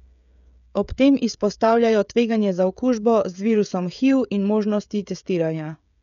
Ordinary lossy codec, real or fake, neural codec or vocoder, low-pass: none; fake; codec, 16 kHz, 16 kbps, FreqCodec, smaller model; 7.2 kHz